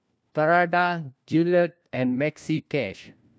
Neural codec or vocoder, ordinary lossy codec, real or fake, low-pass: codec, 16 kHz, 1 kbps, FunCodec, trained on LibriTTS, 50 frames a second; none; fake; none